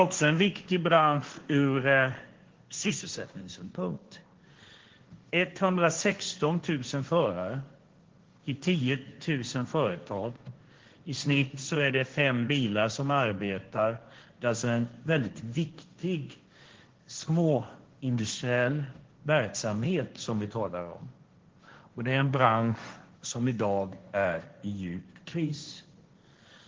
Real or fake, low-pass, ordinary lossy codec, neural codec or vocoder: fake; 7.2 kHz; Opus, 16 kbps; codec, 16 kHz, 1.1 kbps, Voila-Tokenizer